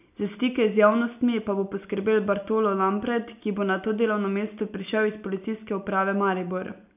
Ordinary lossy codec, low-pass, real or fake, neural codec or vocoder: none; 3.6 kHz; real; none